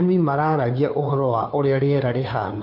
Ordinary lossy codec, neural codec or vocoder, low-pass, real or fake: none; codec, 16 kHz, 2 kbps, FunCodec, trained on Chinese and English, 25 frames a second; 5.4 kHz; fake